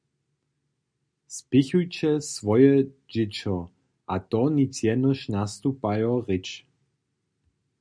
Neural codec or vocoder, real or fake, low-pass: none; real; 9.9 kHz